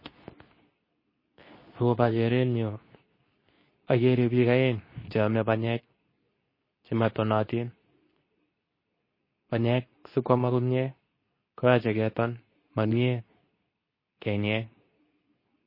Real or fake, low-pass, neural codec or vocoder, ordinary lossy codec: fake; 5.4 kHz; codec, 24 kHz, 0.9 kbps, WavTokenizer, medium speech release version 2; MP3, 24 kbps